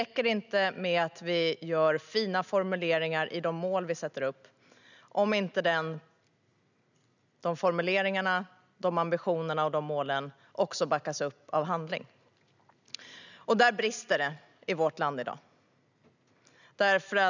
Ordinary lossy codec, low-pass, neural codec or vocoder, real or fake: none; 7.2 kHz; none; real